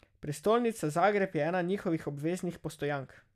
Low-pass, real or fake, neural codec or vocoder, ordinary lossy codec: 14.4 kHz; real; none; none